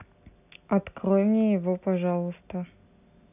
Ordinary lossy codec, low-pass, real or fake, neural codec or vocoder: none; 3.6 kHz; real; none